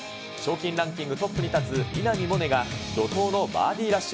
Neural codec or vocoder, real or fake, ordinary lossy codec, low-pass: none; real; none; none